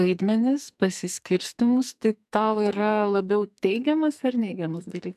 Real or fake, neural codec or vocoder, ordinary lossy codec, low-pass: fake; codec, 44.1 kHz, 2.6 kbps, SNAC; MP3, 96 kbps; 14.4 kHz